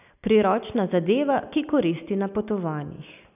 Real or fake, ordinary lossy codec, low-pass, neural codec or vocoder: real; none; 3.6 kHz; none